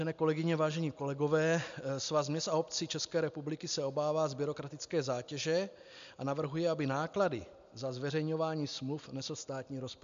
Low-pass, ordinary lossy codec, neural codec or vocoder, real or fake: 7.2 kHz; MP3, 64 kbps; none; real